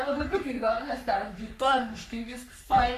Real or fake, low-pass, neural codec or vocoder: fake; 14.4 kHz; codec, 44.1 kHz, 3.4 kbps, Pupu-Codec